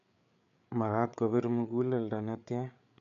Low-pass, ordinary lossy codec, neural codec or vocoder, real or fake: 7.2 kHz; MP3, 96 kbps; codec, 16 kHz, 8 kbps, FreqCodec, larger model; fake